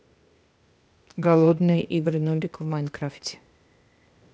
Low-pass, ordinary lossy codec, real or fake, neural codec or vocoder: none; none; fake; codec, 16 kHz, 0.8 kbps, ZipCodec